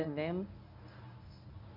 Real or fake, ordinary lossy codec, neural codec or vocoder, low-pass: fake; none; codec, 24 kHz, 0.9 kbps, WavTokenizer, medium speech release version 1; 5.4 kHz